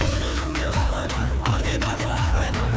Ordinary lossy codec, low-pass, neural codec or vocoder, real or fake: none; none; codec, 16 kHz, 2 kbps, FreqCodec, larger model; fake